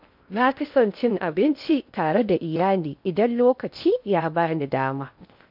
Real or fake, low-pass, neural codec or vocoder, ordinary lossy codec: fake; 5.4 kHz; codec, 16 kHz in and 24 kHz out, 0.6 kbps, FocalCodec, streaming, 2048 codes; MP3, 48 kbps